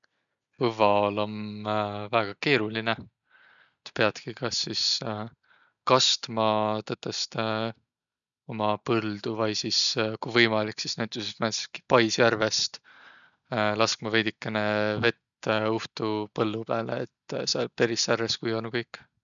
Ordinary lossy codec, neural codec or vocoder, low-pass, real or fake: none; none; 7.2 kHz; real